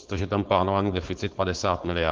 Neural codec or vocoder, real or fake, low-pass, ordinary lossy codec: codec, 16 kHz, 4.8 kbps, FACodec; fake; 7.2 kHz; Opus, 16 kbps